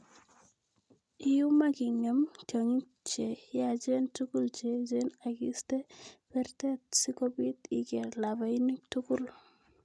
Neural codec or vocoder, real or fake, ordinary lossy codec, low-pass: none; real; none; none